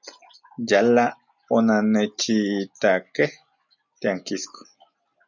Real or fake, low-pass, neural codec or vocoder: real; 7.2 kHz; none